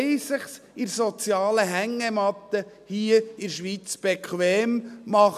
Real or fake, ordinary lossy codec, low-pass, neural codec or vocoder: real; none; 14.4 kHz; none